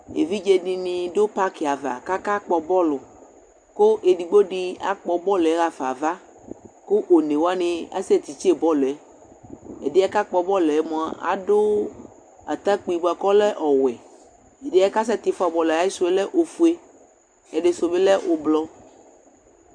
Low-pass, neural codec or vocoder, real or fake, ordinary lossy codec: 9.9 kHz; none; real; Opus, 64 kbps